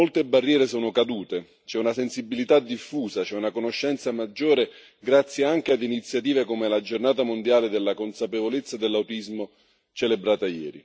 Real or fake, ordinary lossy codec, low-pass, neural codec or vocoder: real; none; none; none